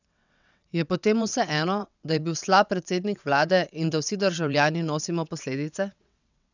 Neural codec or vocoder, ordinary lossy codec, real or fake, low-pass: vocoder, 22.05 kHz, 80 mel bands, WaveNeXt; none; fake; 7.2 kHz